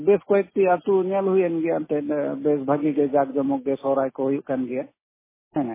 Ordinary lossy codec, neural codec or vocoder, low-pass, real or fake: MP3, 16 kbps; none; 3.6 kHz; real